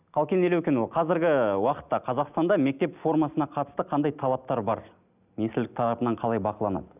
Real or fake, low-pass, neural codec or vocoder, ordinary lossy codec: real; 3.6 kHz; none; none